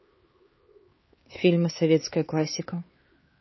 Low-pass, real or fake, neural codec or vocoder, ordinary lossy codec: 7.2 kHz; fake; codec, 16 kHz, 4 kbps, X-Codec, HuBERT features, trained on LibriSpeech; MP3, 24 kbps